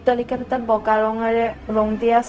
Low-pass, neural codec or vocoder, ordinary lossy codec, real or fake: none; codec, 16 kHz, 0.4 kbps, LongCat-Audio-Codec; none; fake